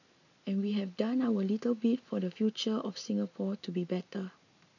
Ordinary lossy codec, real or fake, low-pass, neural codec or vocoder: none; real; 7.2 kHz; none